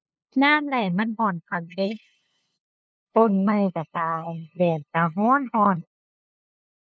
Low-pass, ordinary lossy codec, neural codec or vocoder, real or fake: none; none; codec, 16 kHz, 2 kbps, FunCodec, trained on LibriTTS, 25 frames a second; fake